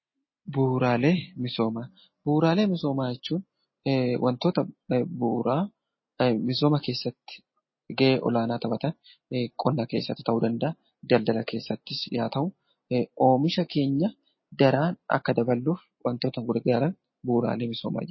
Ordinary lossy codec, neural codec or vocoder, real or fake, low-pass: MP3, 24 kbps; none; real; 7.2 kHz